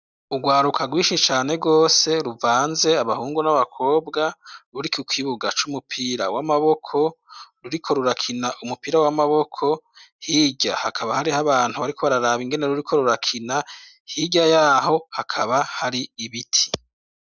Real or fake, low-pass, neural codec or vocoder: real; 7.2 kHz; none